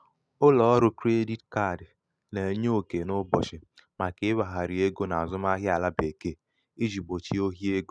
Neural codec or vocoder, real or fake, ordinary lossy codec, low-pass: none; real; none; none